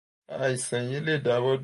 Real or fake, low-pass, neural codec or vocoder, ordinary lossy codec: real; 14.4 kHz; none; MP3, 48 kbps